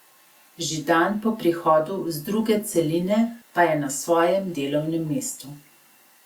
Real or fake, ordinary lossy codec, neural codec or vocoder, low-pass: real; Opus, 64 kbps; none; 19.8 kHz